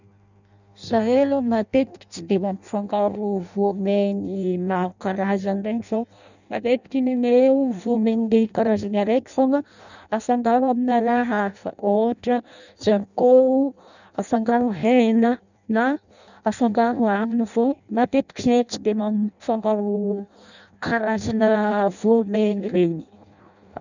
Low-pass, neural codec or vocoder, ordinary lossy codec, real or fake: 7.2 kHz; codec, 16 kHz in and 24 kHz out, 0.6 kbps, FireRedTTS-2 codec; none; fake